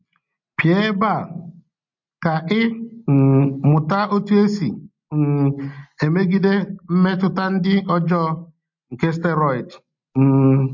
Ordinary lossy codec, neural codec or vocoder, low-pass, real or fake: MP3, 48 kbps; none; 7.2 kHz; real